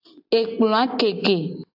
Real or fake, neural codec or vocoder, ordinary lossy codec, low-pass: real; none; AAC, 48 kbps; 5.4 kHz